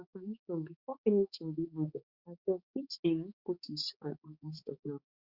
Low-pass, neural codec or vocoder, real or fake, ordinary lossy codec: 5.4 kHz; codec, 16 kHz, 4 kbps, X-Codec, HuBERT features, trained on balanced general audio; fake; Opus, 64 kbps